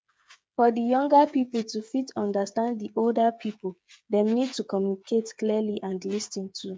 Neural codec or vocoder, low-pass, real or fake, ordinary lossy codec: codec, 16 kHz, 8 kbps, FreqCodec, smaller model; none; fake; none